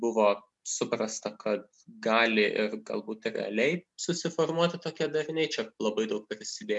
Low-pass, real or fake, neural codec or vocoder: 10.8 kHz; real; none